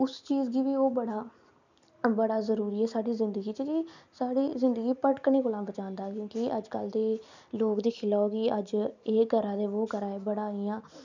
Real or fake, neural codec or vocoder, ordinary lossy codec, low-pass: real; none; none; 7.2 kHz